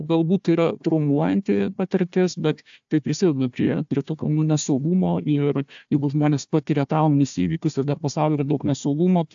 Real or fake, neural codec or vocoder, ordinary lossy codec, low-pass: fake; codec, 16 kHz, 1 kbps, FunCodec, trained on Chinese and English, 50 frames a second; AAC, 64 kbps; 7.2 kHz